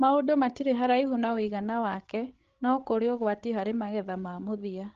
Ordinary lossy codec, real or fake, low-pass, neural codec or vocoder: Opus, 16 kbps; fake; 7.2 kHz; codec, 16 kHz, 16 kbps, FreqCodec, larger model